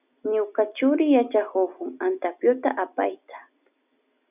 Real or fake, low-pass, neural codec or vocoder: real; 3.6 kHz; none